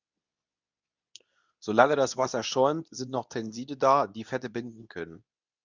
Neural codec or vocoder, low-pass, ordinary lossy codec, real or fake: codec, 24 kHz, 0.9 kbps, WavTokenizer, medium speech release version 2; 7.2 kHz; Opus, 64 kbps; fake